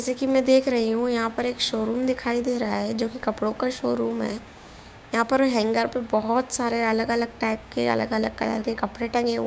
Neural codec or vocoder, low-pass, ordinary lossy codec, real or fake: codec, 16 kHz, 6 kbps, DAC; none; none; fake